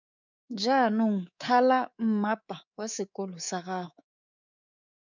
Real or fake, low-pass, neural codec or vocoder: fake; 7.2 kHz; codec, 24 kHz, 3.1 kbps, DualCodec